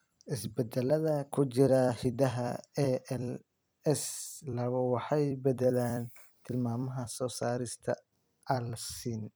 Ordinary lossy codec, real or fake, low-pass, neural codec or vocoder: none; fake; none; vocoder, 44.1 kHz, 128 mel bands every 256 samples, BigVGAN v2